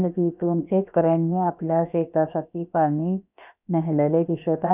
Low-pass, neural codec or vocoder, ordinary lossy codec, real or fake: 3.6 kHz; codec, 16 kHz, about 1 kbps, DyCAST, with the encoder's durations; none; fake